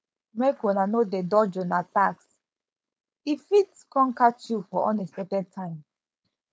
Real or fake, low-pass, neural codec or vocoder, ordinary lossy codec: fake; none; codec, 16 kHz, 4.8 kbps, FACodec; none